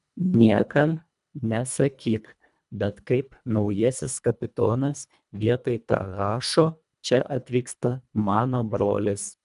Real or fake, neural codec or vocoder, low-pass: fake; codec, 24 kHz, 1.5 kbps, HILCodec; 10.8 kHz